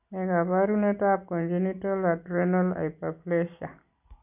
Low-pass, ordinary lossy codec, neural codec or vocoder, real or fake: 3.6 kHz; none; none; real